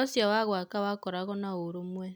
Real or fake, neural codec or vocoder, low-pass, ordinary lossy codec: real; none; none; none